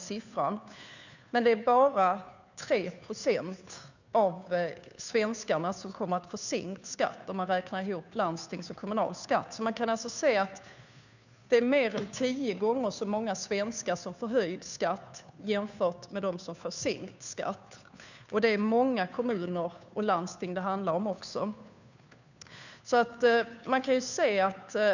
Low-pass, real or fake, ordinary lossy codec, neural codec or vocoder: 7.2 kHz; fake; none; codec, 16 kHz, 2 kbps, FunCodec, trained on Chinese and English, 25 frames a second